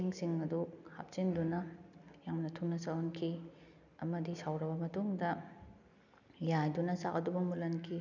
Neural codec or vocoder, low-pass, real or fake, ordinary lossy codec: none; 7.2 kHz; real; none